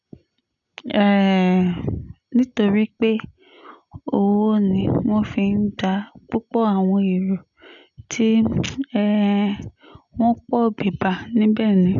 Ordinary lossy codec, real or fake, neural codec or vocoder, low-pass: none; real; none; 7.2 kHz